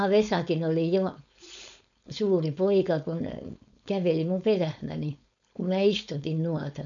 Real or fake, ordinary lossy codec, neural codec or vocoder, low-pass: fake; none; codec, 16 kHz, 4.8 kbps, FACodec; 7.2 kHz